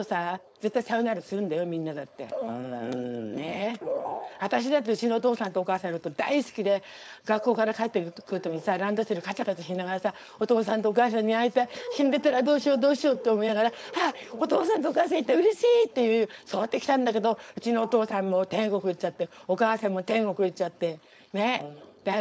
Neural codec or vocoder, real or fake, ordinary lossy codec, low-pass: codec, 16 kHz, 4.8 kbps, FACodec; fake; none; none